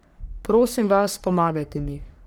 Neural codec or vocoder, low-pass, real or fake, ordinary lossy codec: codec, 44.1 kHz, 3.4 kbps, Pupu-Codec; none; fake; none